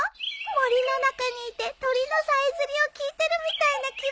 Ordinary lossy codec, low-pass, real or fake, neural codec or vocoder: none; none; real; none